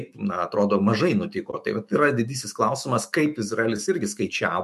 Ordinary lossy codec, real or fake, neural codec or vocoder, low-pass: MP3, 64 kbps; fake; autoencoder, 48 kHz, 128 numbers a frame, DAC-VAE, trained on Japanese speech; 14.4 kHz